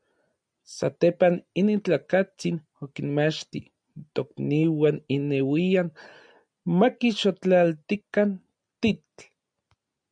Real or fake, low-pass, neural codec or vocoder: real; 9.9 kHz; none